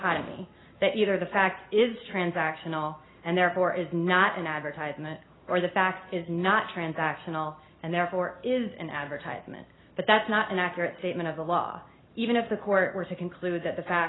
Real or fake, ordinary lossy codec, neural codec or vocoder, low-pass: real; AAC, 16 kbps; none; 7.2 kHz